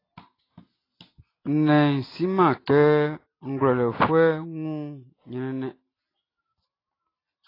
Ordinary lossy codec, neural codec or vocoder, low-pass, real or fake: AAC, 24 kbps; none; 5.4 kHz; real